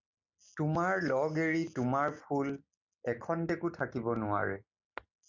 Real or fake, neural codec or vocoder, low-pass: real; none; 7.2 kHz